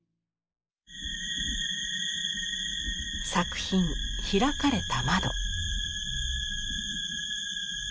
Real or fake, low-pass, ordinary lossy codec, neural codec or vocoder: real; none; none; none